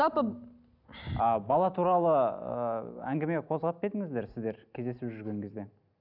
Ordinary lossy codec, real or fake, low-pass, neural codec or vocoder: none; real; 5.4 kHz; none